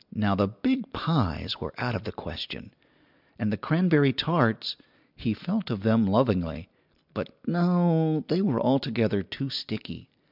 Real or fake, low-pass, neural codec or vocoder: real; 5.4 kHz; none